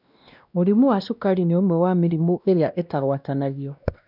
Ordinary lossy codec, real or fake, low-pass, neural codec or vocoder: none; fake; 5.4 kHz; codec, 16 kHz, 1 kbps, X-Codec, WavLM features, trained on Multilingual LibriSpeech